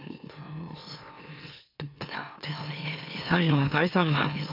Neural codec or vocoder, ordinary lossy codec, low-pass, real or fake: autoencoder, 44.1 kHz, a latent of 192 numbers a frame, MeloTTS; none; 5.4 kHz; fake